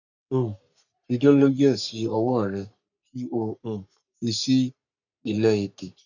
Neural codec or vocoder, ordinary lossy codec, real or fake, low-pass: codec, 44.1 kHz, 3.4 kbps, Pupu-Codec; none; fake; 7.2 kHz